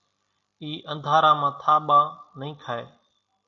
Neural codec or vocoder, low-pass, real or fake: none; 7.2 kHz; real